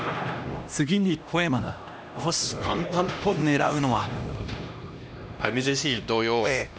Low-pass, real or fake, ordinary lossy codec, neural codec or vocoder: none; fake; none; codec, 16 kHz, 1 kbps, X-Codec, HuBERT features, trained on LibriSpeech